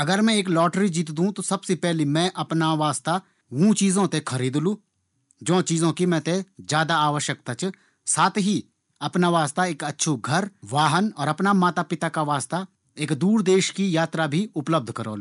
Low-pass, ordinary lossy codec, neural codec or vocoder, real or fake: 10.8 kHz; none; none; real